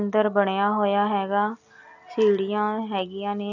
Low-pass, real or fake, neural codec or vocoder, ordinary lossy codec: 7.2 kHz; real; none; none